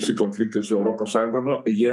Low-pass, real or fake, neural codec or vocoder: 10.8 kHz; fake; codec, 32 kHz, 1.9 kbps, SNAC